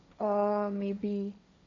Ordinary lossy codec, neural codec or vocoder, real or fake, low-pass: none; codec, 16 kHz, 1.1 kbps, Voila-Tokenizer; fake; 7.2 kHz